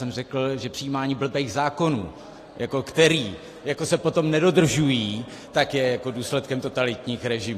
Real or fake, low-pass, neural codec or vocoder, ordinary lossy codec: real; 14.4 kHz; none; AAC, 48 kbps